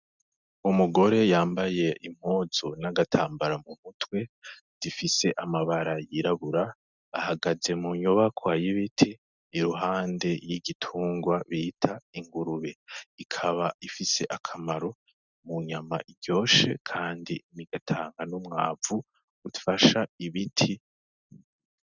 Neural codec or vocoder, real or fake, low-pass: none; real; 7.2 kHz